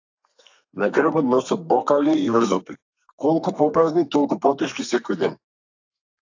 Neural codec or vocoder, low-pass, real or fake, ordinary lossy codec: codec, 32 kHz, 1.9 kbps, SNAC; 7.2 kHz; fake; AAC, 48 kbps